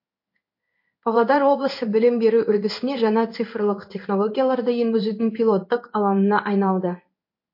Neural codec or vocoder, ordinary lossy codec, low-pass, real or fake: codec, 16 kHz in and 24 kHz out, 1 kbps, XY-Tokenizer; MP3, 32 kbps; 5.4 kHz; fake